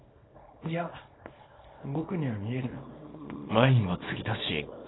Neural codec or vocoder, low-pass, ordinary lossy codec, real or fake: codec, 24 kHz, 0.9 kbps, WavTokenizer, small release; 7.2 kHz; AAC, 16 kbps; fake